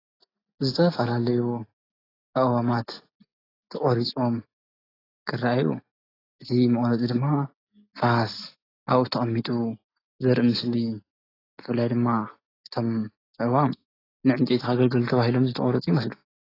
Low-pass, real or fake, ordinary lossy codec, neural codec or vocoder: 5.4 kHz; real; AAC, 24 kbps; none